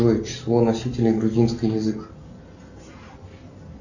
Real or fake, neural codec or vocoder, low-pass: real; none; 7.2 kHz